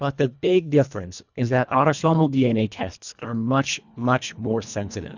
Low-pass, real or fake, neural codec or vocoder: 7.2 kHz; fake; codec, 24 kHz, 1.5 kbps, HILCodec